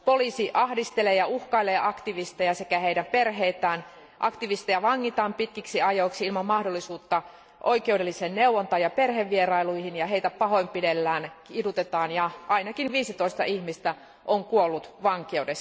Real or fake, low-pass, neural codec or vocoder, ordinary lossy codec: real; none; none; none